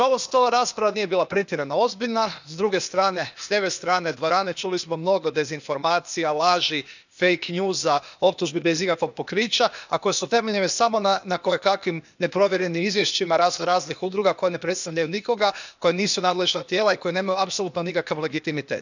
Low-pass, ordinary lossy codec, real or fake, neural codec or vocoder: 7.2 kHz; none; fake; codec, 16 kHz, 0.8 kbps, ZipCodec